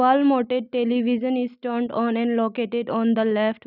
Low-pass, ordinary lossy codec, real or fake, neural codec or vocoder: 5.4 kHz; none; real; none